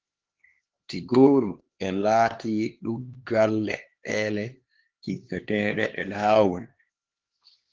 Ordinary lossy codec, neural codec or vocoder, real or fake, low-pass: Opus, 16 kbps; codec, 16 kHz, 2 kbps, X-Codec, HuBERT features, trained on LibriSpeech; fake; 7.2 kHz